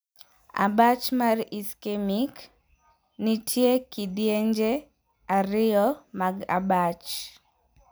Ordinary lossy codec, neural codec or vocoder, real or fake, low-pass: none; none; real; none